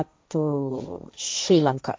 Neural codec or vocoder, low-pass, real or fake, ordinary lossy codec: codec, 16 kHz, 1.1 kbps, Voila-Tokenizer; none; fake; none